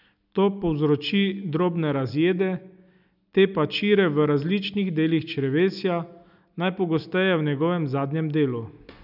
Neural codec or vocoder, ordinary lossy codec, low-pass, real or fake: none; none; 5.4 kHz; real